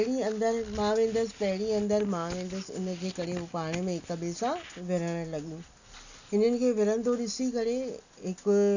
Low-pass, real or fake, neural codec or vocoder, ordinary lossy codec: 7.2 kHz; real; none; none